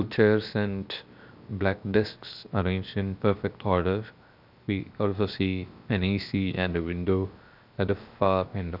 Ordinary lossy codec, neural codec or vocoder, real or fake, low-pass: none; codec, 16 kHz, 0.7 kbps, FocalCodec; fake; 5.4 kHz